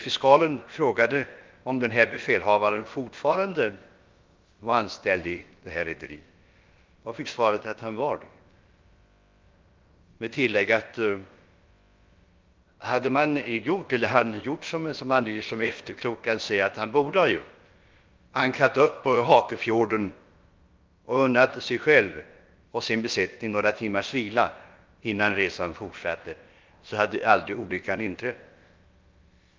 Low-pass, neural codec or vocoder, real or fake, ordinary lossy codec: 7.2 kHz; codec, 16 kHz, about 1 kbps, DyCAST, with the encoder's durations; fake; Opus, 32 kbps